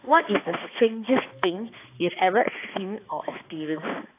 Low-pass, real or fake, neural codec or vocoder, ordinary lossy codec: 3.6 kHz; fake; codec, 16 kHz, 2 kbps, X-Codec, HuBERT features, trained on balanced general audio; AAC, 24 kbps